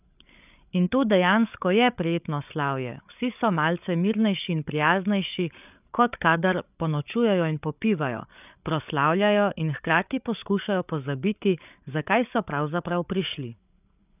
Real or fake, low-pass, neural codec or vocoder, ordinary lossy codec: fake; 3.6 kHz; codec, 16 kHz, 8 kbps, FreqCodec, larger model; none